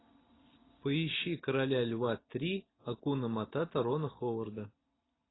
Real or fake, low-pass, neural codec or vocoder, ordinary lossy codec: real; 7.2 kHz; none; AAC, 16 kbps